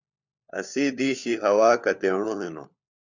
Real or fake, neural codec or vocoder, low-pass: fake; codec, 16 kHz, 4 kbps, FunCodec, trained on LibriTTS, 50 frames a second; 7.2 kHz